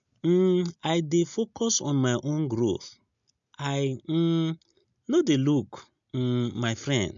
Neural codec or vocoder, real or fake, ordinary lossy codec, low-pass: none; real; MP3, 64 kbps; 7.2 kHz